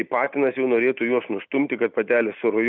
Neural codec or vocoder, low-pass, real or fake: none; 7.2 kHz; real